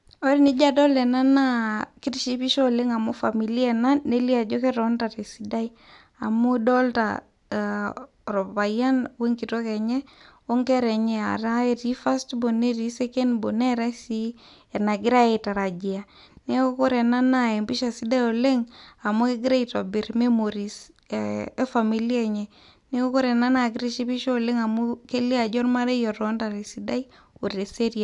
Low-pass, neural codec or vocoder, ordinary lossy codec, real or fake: 10.8 kHz; none; none; real